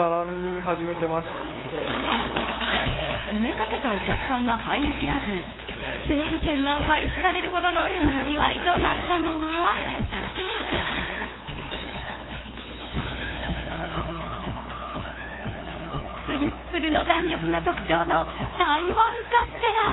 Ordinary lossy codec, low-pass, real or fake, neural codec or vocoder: AAC, 16 kbps; 7.2 kHz; fake; codec, 16 kHz, 2 kbps, FunCodec, trained on LibriTTS, 25 frames a second